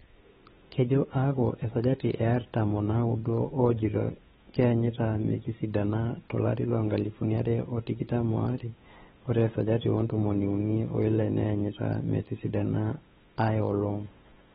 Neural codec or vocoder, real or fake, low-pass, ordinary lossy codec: codec, 16 kHz, 8 kbps, FunCodec, trained on LibriTTS, 25 frames a second; fake; 7.2 kHz; AAC, 16 kbps